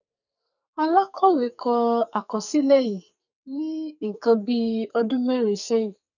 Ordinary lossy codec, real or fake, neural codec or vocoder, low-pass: none; fake; codec, 44.1 kHz, 2.6 kbps, SNAC; 7.2 kHz